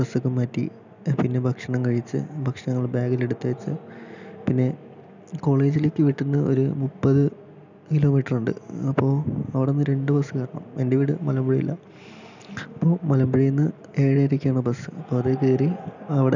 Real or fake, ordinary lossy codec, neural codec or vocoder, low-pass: real; none; none; 7.2 kHz